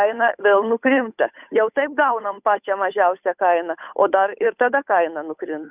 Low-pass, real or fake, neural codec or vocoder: 3.6 kHz; fake; codec, 16 kHz, 8 kbps, FunCodec, trained on Chinese and English, 25 frames a second